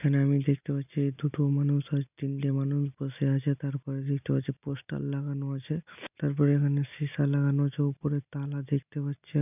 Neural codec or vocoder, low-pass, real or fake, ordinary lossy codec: none; 3.6 kHz; real; none